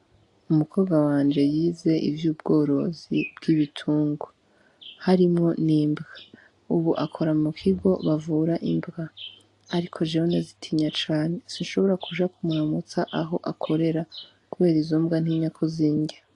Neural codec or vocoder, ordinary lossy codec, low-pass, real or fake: none; AAC, 48 kbps; 10.8 kHz; real